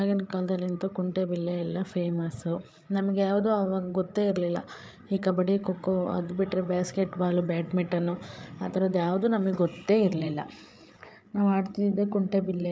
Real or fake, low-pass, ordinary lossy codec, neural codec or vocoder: fake; none; none; codec, 16 kHz, 8 kbps, FreqCodec, larger model